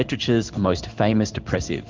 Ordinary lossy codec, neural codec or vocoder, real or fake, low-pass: Opus, 32 kbps; codec, 16 kHz in and 24 kHz out, 1 kbps, XY-Tokenizer; fake; 7.2 kHz